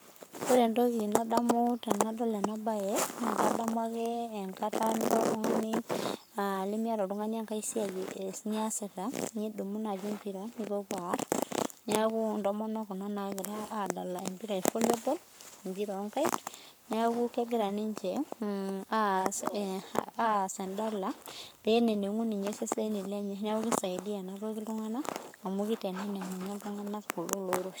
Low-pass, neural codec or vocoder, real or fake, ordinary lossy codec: none; codec, 44.1 kHz, 7.8 kbps, Pupu-Codec; fake; none